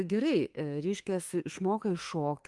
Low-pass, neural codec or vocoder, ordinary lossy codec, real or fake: 10.8 kHz; autoencoder, 48 kHz, 32 numbers a frame, DAC-VAE, trained on Japanese speech; Opus, 24 kbps; fake